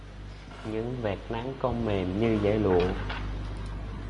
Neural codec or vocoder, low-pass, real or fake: none; 10.8 kHz; real